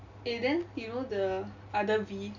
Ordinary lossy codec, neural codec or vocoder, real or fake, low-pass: none; none; real; 7.2 kHz